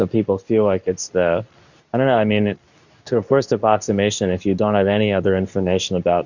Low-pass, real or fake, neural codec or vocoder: 7.2 kHz; fake; codec, 24 kHz, 0.9 kbps, WavTokenizer, medium speech release version 2